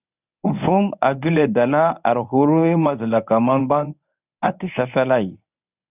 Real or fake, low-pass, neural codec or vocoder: fake; 3.6 kHz; codec, 24 kHz, 0.9 kbps, WavTokenizer, medium speech release version 1